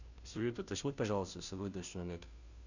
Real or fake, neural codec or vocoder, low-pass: fake; codec, 16 kHz, 0.5 kbps, FunCodec, trained on Chinese and English, 25 frames a second; 7.2 kHz